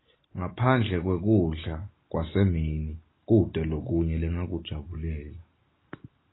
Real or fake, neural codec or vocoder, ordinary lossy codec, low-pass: real; none; AAC, 16 kbps; 7.2 kHz